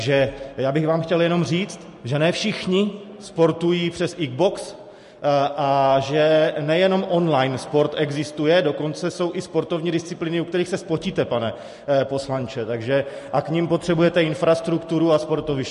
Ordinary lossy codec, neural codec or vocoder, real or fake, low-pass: MP3, 48 kbps; none; real; 14.4 kHz